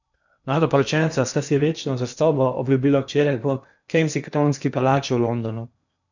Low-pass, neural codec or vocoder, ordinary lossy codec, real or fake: 7.2 kHz; codec, 16 kHz in and 24 kHz out, 0.8 kbps, FocalCodec, streaming, 65536 codes; none; fake